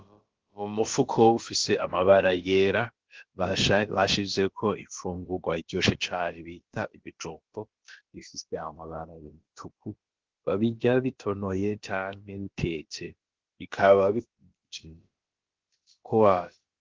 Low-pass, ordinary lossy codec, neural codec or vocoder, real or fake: 7.2 kHz; Opus, 16 kbps; codec, 16 kHz, about 1 kbps, DyCAST, with the encoder's durations; fake